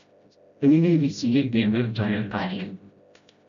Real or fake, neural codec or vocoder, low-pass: fake; codec, 16 kHz, 0.5 kbps, FreqCodec, smaller model; 7.2 kHz